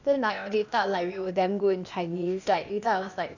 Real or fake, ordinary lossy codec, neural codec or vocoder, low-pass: fake; none; codec, 16 kHz, 0.8 kbps, ZipCodec; 7.2 kHz